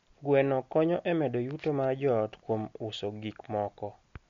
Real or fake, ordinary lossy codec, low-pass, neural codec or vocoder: real; MP3, 48 kbps; 7.2 kHz; none